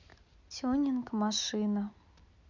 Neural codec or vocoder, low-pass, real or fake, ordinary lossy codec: none; 7.2 kHz; real; none